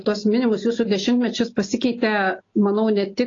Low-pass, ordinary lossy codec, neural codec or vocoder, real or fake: 7.2 kHz; AAC, 32 kbps; none; real